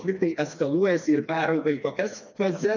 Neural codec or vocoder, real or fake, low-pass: codec, 16 kHz, 2 kbps, FreqCodec, smaller model; fake; 7.2 kHz